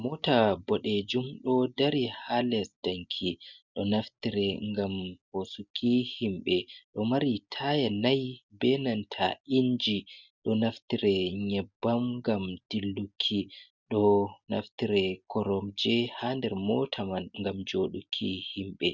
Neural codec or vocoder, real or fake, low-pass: none; real; 7.2 kHz